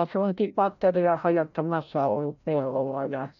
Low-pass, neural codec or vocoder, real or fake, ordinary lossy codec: 7.2 kHz; codec, 16 kHz, 0.5 kbps, FreqCodec, larger model; fake; none